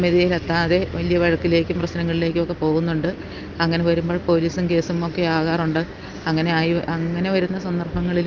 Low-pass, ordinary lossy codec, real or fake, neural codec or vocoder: 7.2 kHz; Opus, 32 kbps; real; none